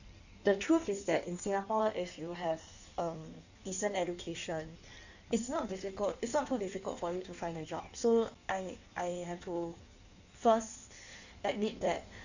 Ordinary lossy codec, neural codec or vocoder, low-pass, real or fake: none; codec, 16 kHz in and 24 kHz out, 1.1 kbps, FireRedTTS-2 codec; 7.2 kHz; fake